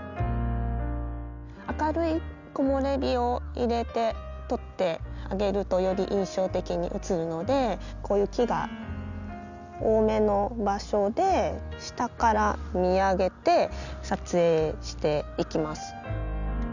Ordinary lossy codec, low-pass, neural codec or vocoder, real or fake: none; 7.2 kHz; none; real